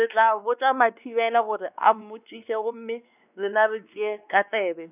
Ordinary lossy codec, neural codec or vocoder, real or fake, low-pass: none; codec, 16 kHz, 2 kbps, X-Codec, WavLM features, trained on Multilingual LibriSpeech; fake; 3.6 kHz